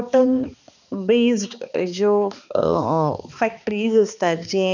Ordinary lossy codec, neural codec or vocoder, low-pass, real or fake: none; codec, 16 kHz, 2 kbps, X-Codec, HuBERT features, trained on balanced general audio; 7.2 kHz; fake